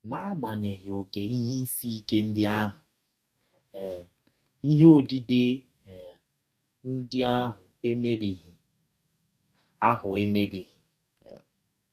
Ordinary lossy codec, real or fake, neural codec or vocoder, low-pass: none; fake; codec, 44.1 kHz, 2.6 kbps, DAC; 14.4 kHz